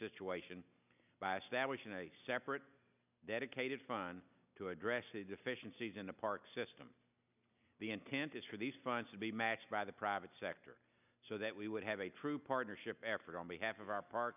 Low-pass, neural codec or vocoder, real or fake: 3.6 kHz; none; real